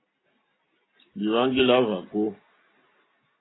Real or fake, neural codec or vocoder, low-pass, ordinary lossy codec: real; none; 7.2 kHz; AAC, 16 kbps